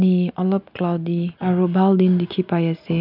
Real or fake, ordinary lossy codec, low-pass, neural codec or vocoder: real; none; 5.4 kHz; none